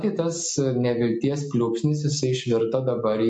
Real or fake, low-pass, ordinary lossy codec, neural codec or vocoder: real; 10.8 kHz; MP3, 48 kbps; none